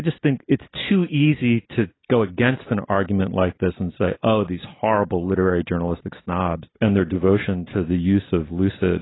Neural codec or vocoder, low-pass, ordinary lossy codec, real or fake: none; 7.2 kHz; AAC, 16 kbps; real